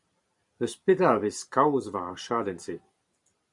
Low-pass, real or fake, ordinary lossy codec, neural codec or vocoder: 10.8 kHz; fake; Opus, 64 kbps; vocoder, 44.1 kHz, 128 mel bands every 512 samples, BigVGAN v2